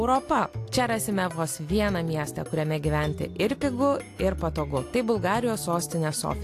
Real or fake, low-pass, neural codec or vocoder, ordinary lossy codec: real; 14.4 kHz; none; AAC, 64 kbps